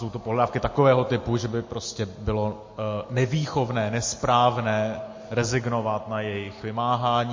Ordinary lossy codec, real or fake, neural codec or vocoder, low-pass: MP3, 32 kbps; real; none; 7.2 kHz